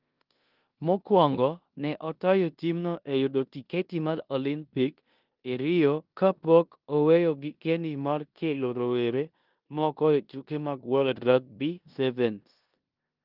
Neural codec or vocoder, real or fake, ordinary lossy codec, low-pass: codec, 16 kHz in and 24 kHz out, 0.9 kbps, LongCat-Audio-Codec, four codebook decoder; fake; Opus, 24 kbps; 5.4 kHz